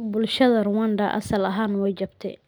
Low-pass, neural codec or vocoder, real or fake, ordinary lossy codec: none; none; real; none